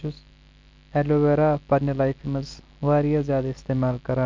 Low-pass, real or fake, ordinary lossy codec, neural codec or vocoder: 7.2 kHz; real; Opus, 24 kbps; none